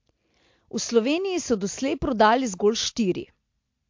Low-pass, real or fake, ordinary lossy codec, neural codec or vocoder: 7.2 kHz; real; MP3, 48 kbps; none